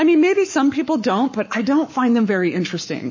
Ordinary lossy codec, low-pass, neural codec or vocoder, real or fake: MP3, 32 kbps; 7.2 kHz; codec, 44.1 kHz, 7.8 kbps, Pupu-Codec; fake